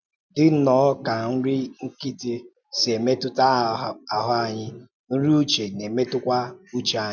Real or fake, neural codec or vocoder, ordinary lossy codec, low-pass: real; none; none; 7.2 kHz